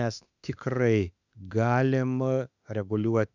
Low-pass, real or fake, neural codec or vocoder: 7.2 kHz; fake; autoencoder, 48 kHz, 32 numbers a frame, DAC-VAE, trained on Japanese speech